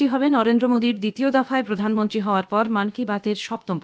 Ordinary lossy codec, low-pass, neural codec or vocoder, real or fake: none; none; codec, 16 kHz, about 1 kbps, DyCAST, with the encoder's durations; fake